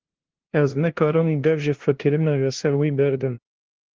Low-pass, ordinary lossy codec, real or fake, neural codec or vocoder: 7.2 kHz; Opus, 16 kbps; fake; codec, 16 kHz, 0.5 kbps, FunCodec, trained on LibriTTS, 25 frames a second